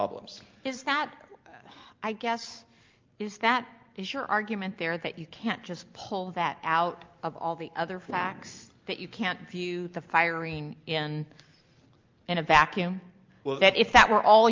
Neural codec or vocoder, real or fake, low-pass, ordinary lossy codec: none; real; 7.2 kHz; Opus, 24 kbps